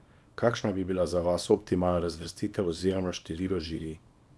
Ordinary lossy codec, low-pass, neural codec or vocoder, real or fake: none; none; codec, 24 kHz, 0.9 kbps, WavTokenizer, small release; fake